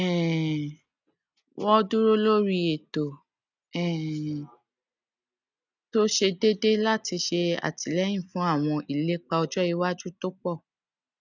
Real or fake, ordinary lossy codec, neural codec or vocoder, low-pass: real; none; none; 7.2 kHz